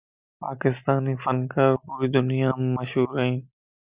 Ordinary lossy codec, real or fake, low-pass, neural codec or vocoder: Opus, 64 kbps; real; 3.6 kHz; none